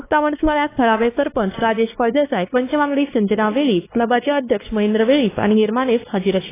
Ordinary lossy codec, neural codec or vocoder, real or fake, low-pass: AAC, 16 kbps; codec, 16 kHz, 2 kbps, X-Codec, WavLM features, trained on Multilingual LibriSpeech; fake; 3.6 kHz